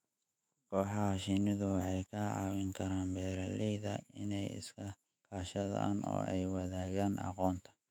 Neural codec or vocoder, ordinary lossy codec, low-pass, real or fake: autoencoder, 48 kHz, 128 numbers a frame, DAC-VAE, trained on Japanese speech; none; 19.8 kHz; fake